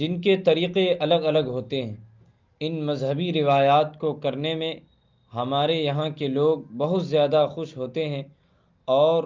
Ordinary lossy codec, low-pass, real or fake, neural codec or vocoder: Opus, 32 kbps; 7.2 kHz; real; none